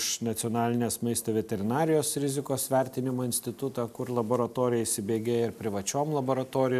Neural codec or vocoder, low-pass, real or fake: none; 14.4 kHz; real